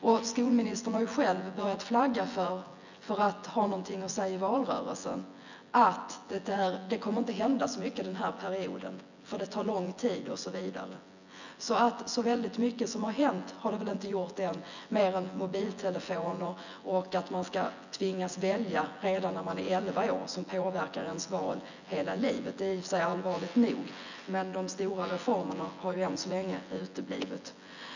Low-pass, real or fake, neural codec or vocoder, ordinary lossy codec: 7.2 kHz; fake; vocoder, 24 kHz, 100 mel bands, Vocos; none